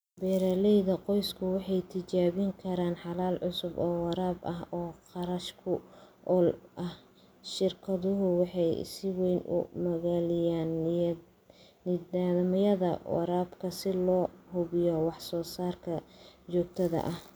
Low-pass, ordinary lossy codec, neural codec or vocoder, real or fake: none; none; none; real